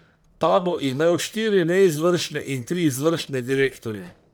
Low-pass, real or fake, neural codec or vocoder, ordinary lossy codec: none; fake; codec, 44.1 kHz, 1.7 kbps, Pupu-Codec; none